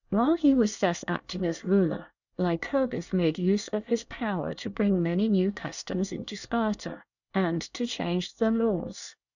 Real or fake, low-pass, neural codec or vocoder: fake; 7.2 kHz; codec, 24 kHz, 1 kbps, SNAC